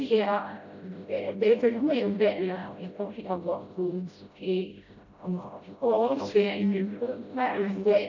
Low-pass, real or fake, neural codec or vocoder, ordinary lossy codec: 7.2 kHz; fake; codec, 16 kHz, 0.5 kbps, FreqCodec, smaller model; none